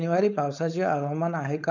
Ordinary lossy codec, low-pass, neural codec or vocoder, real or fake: none; 7.2 kHz; codec, 16 kHz, 4.8 kbps, FACodec; fake